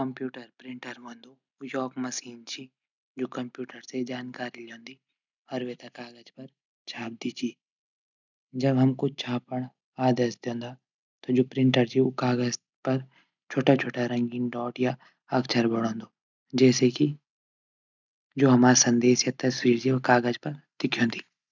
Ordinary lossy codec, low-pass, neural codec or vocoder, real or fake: none; 7.2 kHz; none; real